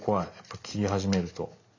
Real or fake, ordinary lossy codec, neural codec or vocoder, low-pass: real; AAC, 48 kbps; none; 7.2 kHz